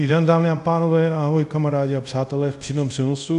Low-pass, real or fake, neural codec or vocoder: 10.8 kHz; fake; codec, 24 kHz, 0.5 kbps, DualCodec